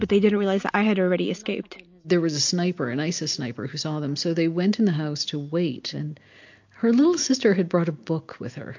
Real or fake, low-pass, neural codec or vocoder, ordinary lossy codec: real; 7.2 kHz; none; MP3, 48 kbps